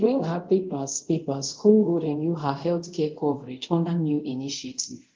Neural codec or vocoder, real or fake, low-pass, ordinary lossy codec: codec, 24 kHz, 0.5 kbps, DualCodec; fake; 7.2 kHz; Opus, 16 kbps